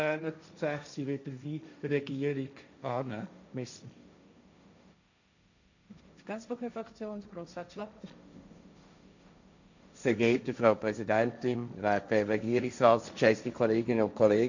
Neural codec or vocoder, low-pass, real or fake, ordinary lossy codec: codec, 16 kHz, 1.1 kbps, Voila-Tokenizer; 7.2 kHz; fake; none